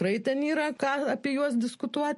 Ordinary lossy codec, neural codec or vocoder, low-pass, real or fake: MP3, 48 kbps; none; 14.4 kHz; real